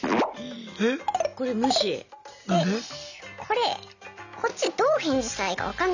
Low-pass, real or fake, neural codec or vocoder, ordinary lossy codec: 7.2 kHz; real; none; none